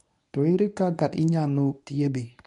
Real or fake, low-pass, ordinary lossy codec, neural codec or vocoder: fake; 10.8 kHz; none; codec, 24 kHz, 0.9 kbps, WavTokenizer, medium speech release version 1